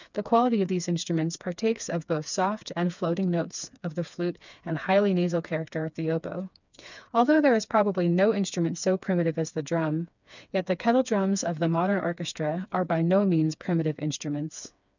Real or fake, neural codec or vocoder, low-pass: fake; codec, 16 kHz, 4 kbps, FreqCodec, smaller model; 7.2 kHz